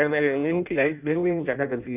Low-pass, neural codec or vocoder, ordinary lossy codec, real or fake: 3.6 kHz; codec, 16 kHz in and 24 kHz out, 0.6 kbps, FireRedTTS-2 codec; none; fake